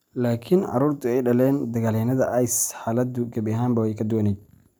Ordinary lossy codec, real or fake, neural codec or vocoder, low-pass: none; real; none; none